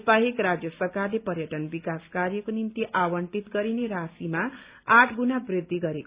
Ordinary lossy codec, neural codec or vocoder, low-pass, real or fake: Opus, 64 kbps; none; 3.6 kHz; real